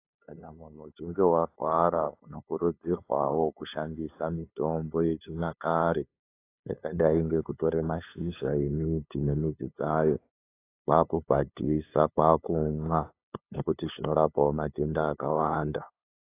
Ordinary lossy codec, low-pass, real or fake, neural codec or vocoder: AAC, 24 kbps; 3.6 kHz; fake; codec, 16 kHz, 2 kbps, FunCodec, trained on LibriTTS, 25 frames a second